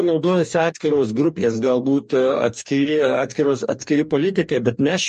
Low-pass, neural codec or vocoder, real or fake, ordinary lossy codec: 14.4 kHz; codec, 44.1 kHz, 2.6 kbps, DAC; fake; MP3, 48 kbps